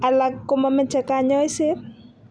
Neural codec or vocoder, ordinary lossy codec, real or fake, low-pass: none; none; real; 9.9 kHz